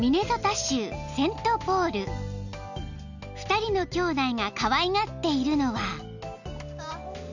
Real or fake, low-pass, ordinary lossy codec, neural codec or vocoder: real; 7.2 kHz; none; none